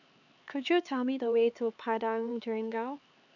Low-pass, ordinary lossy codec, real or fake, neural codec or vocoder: 7.2 kHz; none; fake; codec, 16 kHz, 4 kbps, X-Codec, HuBERT features, trained on LibriSpeech